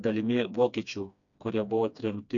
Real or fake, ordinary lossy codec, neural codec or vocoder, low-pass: fake; MP3, 96 kbps; codec, 16 kHz, 2 kbps, FreqCodec, smaller model; 7.2 kHz